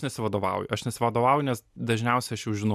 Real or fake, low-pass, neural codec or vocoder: real; 14.4 kHz; none